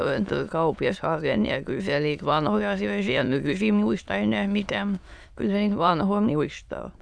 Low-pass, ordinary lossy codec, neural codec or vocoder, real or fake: none; none; autoencoder, 22.05 kHz, a latent of 192 numbers a frame, VITS, trained on many speakers; fake